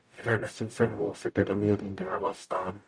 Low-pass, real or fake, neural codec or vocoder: 9.9 kHz; fake; codec, 44.1 kHz, 0.9 kbps, DAC